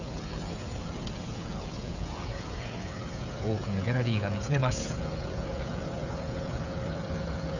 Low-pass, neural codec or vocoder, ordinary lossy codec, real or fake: 7.2 kHz; codec, 16 kHz, 16 kbps, FreqCodec, smaller model; none; fake